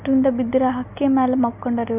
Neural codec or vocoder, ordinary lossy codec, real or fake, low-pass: none; none; real; 3.6 kHz